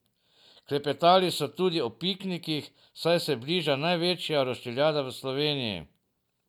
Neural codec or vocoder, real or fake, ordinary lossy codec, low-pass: none; real; none; 19.8 kHz